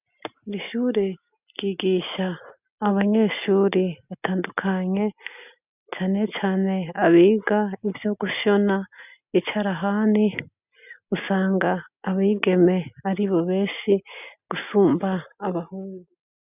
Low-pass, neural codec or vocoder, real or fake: 3.6 kHz; none; real